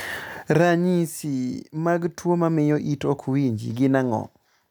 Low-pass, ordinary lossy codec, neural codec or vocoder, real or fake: none; none; none; real